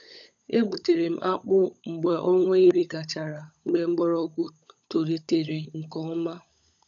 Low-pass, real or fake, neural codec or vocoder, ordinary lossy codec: 7.2 kHz; fake; codec, 16 kHz, 16 kbps, FunCodec, trained on LibriTTS, 50 frames a second; none